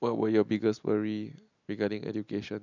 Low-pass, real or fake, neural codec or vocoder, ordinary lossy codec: 7.2 kHz; real; none; none